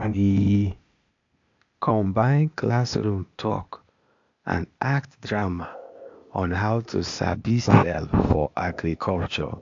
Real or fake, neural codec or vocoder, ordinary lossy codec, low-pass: fake; codec, 16 kHz, 0.8 kbps, ZipCodec; AAC, 64 kbps; 7.2 kHz